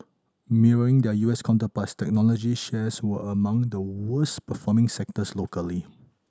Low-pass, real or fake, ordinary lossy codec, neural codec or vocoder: none; real; none; none